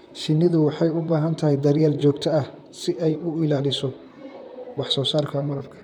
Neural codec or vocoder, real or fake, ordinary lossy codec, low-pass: vocoder, 44.1 kHz, 128 mel bands, Pupu-Vocoder; fake; none; 19.8 kHz